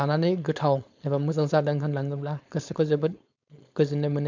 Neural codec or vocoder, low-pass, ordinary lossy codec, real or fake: codec, 16 kHz, 4.8 kbps, FACodec; 7.2 kHz; MP3, 64 kbps; fake